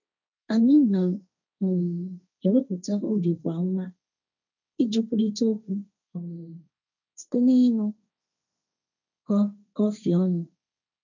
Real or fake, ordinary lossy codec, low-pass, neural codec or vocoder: fake; none; 7.2 kHz; codec, 16 kHz, 1.1 kbps, Voila-Tokenizer